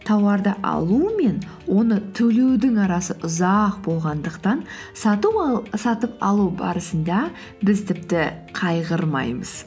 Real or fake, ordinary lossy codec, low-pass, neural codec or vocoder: real; none; none; none